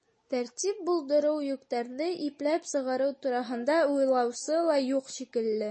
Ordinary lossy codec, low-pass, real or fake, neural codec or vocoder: MP3, 32 kbps; 9.9 kHz; real; none